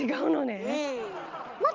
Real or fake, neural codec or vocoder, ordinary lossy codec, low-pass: real; none; Opus, 32 kbps; 7.2 kHz